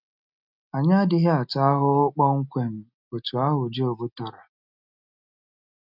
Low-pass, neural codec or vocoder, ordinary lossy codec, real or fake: 5.4 kHz; none; none; real